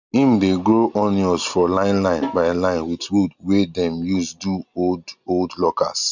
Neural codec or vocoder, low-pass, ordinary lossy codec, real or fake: none; 7.2 kHz; none; real